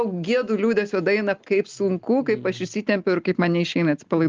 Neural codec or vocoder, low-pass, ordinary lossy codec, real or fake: none; 7.2 kHz; Opus, 24 kbps; real